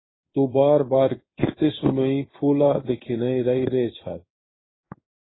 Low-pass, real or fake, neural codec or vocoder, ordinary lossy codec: 7.2 kHz; fake; codec, 16 kHz in and 24 kHz out, 1 kbps, XY-Tokenizer; AAC, 16 kbps